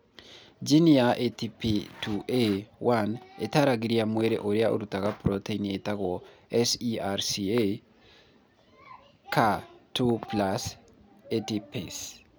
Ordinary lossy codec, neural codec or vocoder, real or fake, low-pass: none; none; real; none